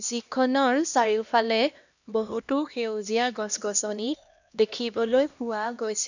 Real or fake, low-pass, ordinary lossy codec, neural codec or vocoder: fake; 7.2 kHz; none; codec, 16 kHz, 1 kbps, X-Codec, HuBERT features, trained on LibriSpeech